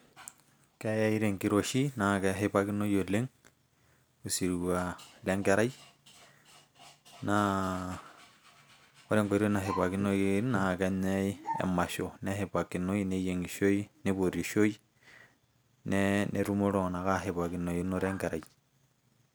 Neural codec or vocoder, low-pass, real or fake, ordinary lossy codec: none; none; real; none